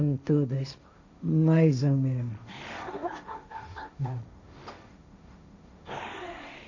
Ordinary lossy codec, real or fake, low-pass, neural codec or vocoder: none; fake; 7.2 kHz; codec, 16 kHz, 1.1 kbps, Voila-Tokenizer